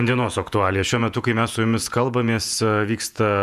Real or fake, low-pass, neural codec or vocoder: fake; 14.4 kHz; vocoder, 48 kHz, 128 mel bands, Vocos